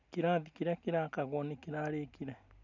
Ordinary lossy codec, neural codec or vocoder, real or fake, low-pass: none; none; real; 7.2 kHz